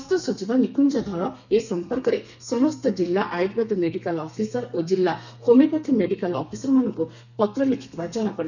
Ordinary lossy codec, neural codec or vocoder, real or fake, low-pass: none; codec, 32 kHz, 1.9 kbps, SNAC; fake; 7.2 kHz